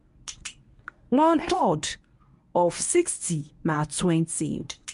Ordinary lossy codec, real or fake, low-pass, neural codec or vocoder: MP3, 64 kbps; fake; 10.8 kHz; codec, 24 kHz, 0.9 kbps, WavTokenizer, medium speech release version 1